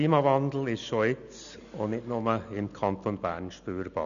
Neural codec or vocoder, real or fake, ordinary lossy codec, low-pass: none; real; none; 7.2 kHz